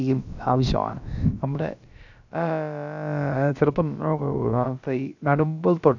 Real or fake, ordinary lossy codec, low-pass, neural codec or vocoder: fake; none; 7.2 kHz; codec, 16 kHz, about 1 kbps, DyCAST, with the encoder's durations